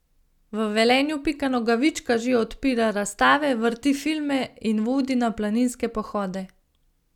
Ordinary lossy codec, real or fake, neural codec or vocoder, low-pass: none; real; none; 19.8 kHz